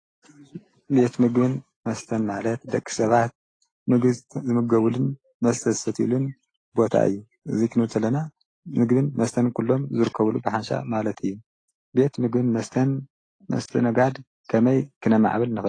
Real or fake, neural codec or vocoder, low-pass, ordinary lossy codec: real; none; 9.9 kHz; AAC, 32 kbps